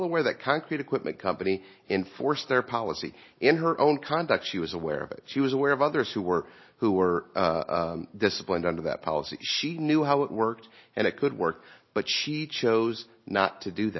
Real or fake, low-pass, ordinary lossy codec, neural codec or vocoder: real; 7.2 kHz; MP3, 24 kbps; none